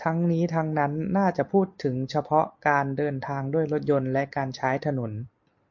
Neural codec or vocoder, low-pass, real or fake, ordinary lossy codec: none; 7.2 kHz; real; MP3, 48 kbps